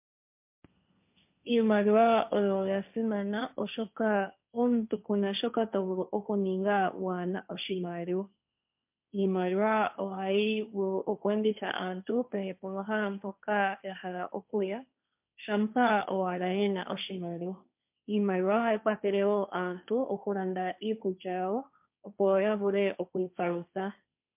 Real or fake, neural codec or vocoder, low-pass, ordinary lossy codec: fake; codec, 16 kHz, 1.1 kbps, Voila-Tokenizer; 3.6 kHz; MP3, 32 kbps